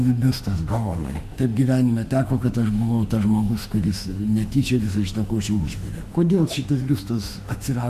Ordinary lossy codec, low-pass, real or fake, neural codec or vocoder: Opus, 64 kbps; 14.4 kHz; fake; autoencoder, 48 kHz, 32 numbers a frame, DAC-VAE, trained on Japanese speech